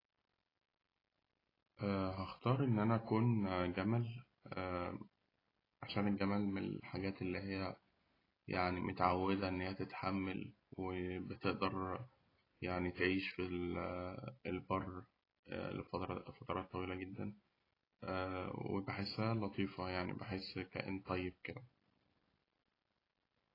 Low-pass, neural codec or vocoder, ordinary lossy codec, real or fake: 5.4 kHz; none; AAC, 24 kbps; real